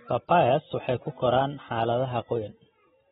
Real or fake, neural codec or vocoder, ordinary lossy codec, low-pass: real; none; AAC, 16 kbps; 19.8 kHz